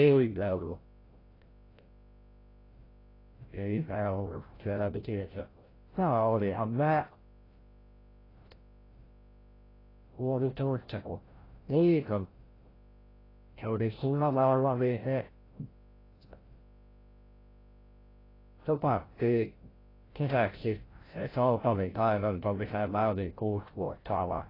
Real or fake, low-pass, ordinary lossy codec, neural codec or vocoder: fake; 5.4 kHz; AAC, 24 kbps; codec, 16 kHz, 0.5 kbps, FreqCodec, larger model